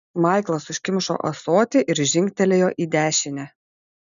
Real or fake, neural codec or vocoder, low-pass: real; none; 7.2 kHz